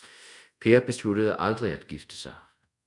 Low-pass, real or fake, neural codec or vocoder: 10.8 kHz; fake; codec, 24 kHz, 0.5 kbps, DualCodec